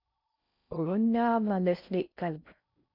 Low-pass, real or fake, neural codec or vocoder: 5.4 kHz; fake; codec, 16 kHz in and 24 kHz out, 0.6 kbps, FocalCodec, streaming, 4096 codes